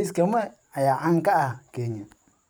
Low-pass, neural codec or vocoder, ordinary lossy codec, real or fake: none; vocoder, 44.1 kHz, 128 mel bands every 512 samples, BigVGAN v2; none; fake